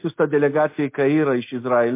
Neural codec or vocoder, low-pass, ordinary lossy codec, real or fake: none; 3.6 kHz; MP3, 24 kbps; real